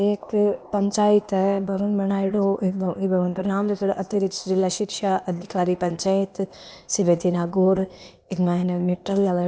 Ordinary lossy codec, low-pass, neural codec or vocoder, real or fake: none; none; codec, 16 kHz, 0.8 kbps, ZipCodec; fake